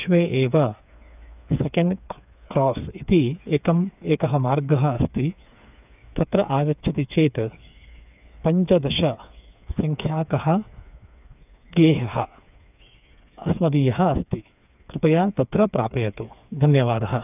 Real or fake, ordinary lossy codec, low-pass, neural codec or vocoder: fake; none; 3.6 kHz; codec, 16 kHz, 4 kbps, FreqCodec, smaller model